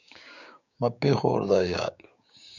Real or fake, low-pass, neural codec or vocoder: fake; 7.2 kHz; codec, 16 kHz, 6 kbps, DAC